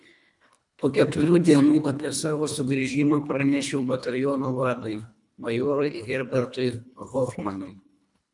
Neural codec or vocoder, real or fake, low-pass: codec, 24 kHz, 1.5 kbps, HILCodec; fake; 10.8 kHz